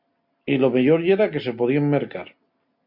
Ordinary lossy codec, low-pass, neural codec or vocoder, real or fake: MP3, 48 kbps; 5.4 kHz; none; real